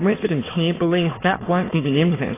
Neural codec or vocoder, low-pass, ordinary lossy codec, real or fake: autoencoder, 22.05 kHz, a latent of 192 numbers a frame, VITS, trained on many speakers; 3.6 kHz; AAC, 16 kbps; fake